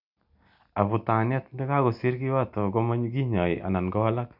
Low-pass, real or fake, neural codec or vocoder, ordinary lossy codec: 5.4 kHz; real; none; none